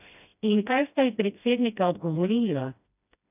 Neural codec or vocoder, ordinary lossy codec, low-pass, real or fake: codec, 16 kHz, 1 kbps, FreqCodec, smaller model; none; 3.6 kHz; fake